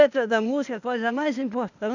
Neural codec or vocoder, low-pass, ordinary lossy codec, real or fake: codec, 16 kHz, 0.8 kbps, ZipCodec; 7.2 kHz; none; fake